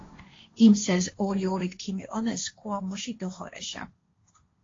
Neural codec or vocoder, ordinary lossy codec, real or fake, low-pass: codec, 16 kHz, 1.1 kbps, Voila-Tokenizer; AAC, 32 kbps; fake; 7.2 kHz